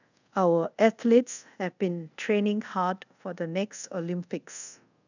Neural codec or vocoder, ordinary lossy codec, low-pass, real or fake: codec, 24 kHz, 0.5 kbps, DualCodec; none; 7.2 kHz; fake